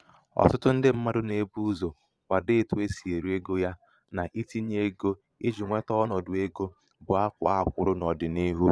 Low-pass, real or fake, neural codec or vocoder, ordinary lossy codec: none; fake; vocoder, 22.05 kHz, 80 mel bands, Vocos; none